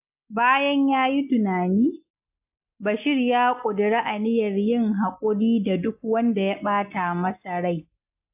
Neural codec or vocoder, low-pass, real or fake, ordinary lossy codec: none; 3.6 kHz; real; AAC, 32 kbps